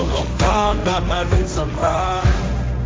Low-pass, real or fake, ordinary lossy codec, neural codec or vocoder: none; fake; none; codec, 16 kHz, 1.1 kbps, Voila-Tokenizer